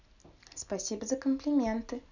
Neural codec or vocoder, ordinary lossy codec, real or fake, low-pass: none; none; real; 7.2 kHz